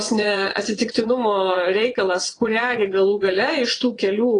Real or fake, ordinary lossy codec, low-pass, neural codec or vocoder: fake; AAC, 32 kbps; 9.9 kHz; vocoder, 22.05 kHz, 80 mel bands, WaveNeXt